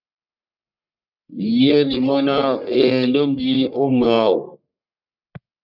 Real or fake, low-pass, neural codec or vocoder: fake; 5.4 kHz; codec, 44.1 kHz, 1.7 kbps, Pupu-Codec